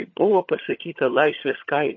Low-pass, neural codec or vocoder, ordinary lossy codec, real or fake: 7.2 kHz; vocoder, 22.05 kHz, 80 mel bands, HiFi-GAN; MP3, 32 kbps; fake